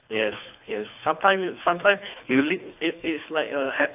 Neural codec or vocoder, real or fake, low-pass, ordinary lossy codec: codec, 24 kHz, 3 kbps, HILCodec; fake; 3.6 kHz; AAC, 32 kbps